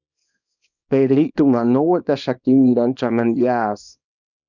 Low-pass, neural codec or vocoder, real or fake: 7.2 kHz; codec, 24 kHz, 0.9 kbps, WavTokenizer, small release; fake